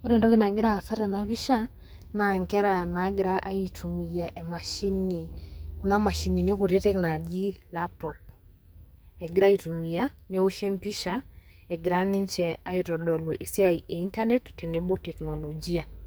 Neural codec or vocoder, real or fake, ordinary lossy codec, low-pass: codec, 44.1 kHz, 2.6 kbps, SNAC; fake; none; none